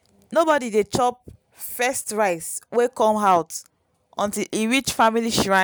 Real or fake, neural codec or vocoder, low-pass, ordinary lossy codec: real; none; none; none